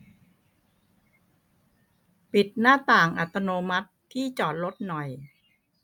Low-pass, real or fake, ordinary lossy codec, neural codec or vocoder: 19.8 kHz; real; none; none